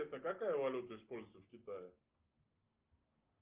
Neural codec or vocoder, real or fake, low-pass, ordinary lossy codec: none; real; 3.6 kHz; Opus, 32 kbps